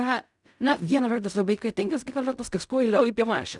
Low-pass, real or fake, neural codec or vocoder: 10.8 kHz; fake; codec, 16 kHz in and 24 kHz out, 0.4 kbps, LongCat-Audio-Codec, fine tuned four codebook decoder